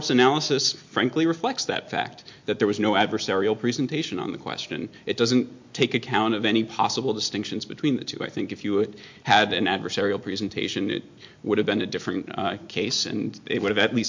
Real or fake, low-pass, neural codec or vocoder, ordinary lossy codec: real; 7.2 kHz; none; MP3, 48 kbps